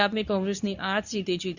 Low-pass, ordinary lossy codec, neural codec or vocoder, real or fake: 7.2 kHz; none; codec, 24 kHz, 0.9 kbps, WavTokenizer, medium speech release version 2; fake